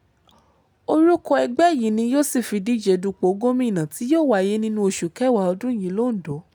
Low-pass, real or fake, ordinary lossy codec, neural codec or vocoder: none; real; none; none